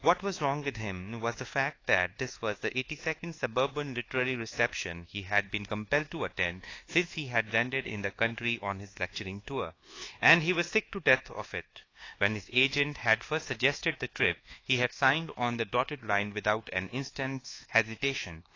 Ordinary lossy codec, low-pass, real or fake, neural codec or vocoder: AAC, 32 kbps; 7.2 kHz; fake; codec, 24 kHz, 1.2 kbps, DualCodec